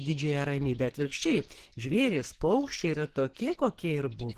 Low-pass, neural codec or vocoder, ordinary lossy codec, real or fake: 14.4 kHz; codec, 44.1 kHz, 2.6 kbps, SNAC; Opus, 16 kbps; fake